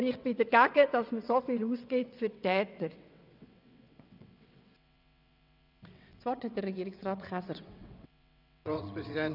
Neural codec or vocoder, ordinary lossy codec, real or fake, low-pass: vocoder, 22.05 kHz, 80 mel bands, WaveNeXt; none; fake; 5.4 kHz